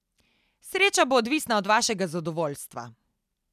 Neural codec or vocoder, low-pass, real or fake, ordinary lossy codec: none; 14.4 kHz; real; none